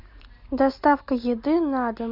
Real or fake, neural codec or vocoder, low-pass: real; none; 5.4 kHz